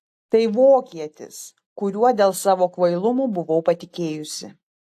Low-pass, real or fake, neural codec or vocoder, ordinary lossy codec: 14.4 kHz; real; none; AAC, 64 kbps